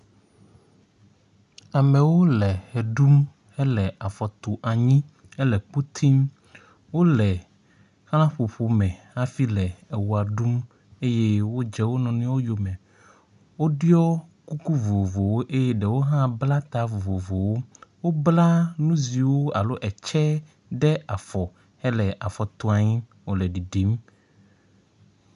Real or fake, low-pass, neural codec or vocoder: real; 10.8 kHz; none